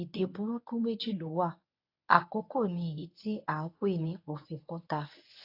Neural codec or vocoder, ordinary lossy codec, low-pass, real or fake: codec, 24 kHz, 0.9 kbps, WavTokenizer, medium speech release version 1; AAC, 32 kbps; 5.4 kHz; fake